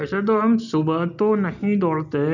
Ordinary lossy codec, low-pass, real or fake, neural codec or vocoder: none; 7.2 kHz; real; none